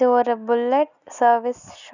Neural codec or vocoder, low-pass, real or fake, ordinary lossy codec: none; 7.2 kHz; real; none